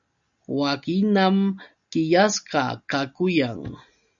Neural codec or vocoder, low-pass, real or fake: none; 7.2 kHz; real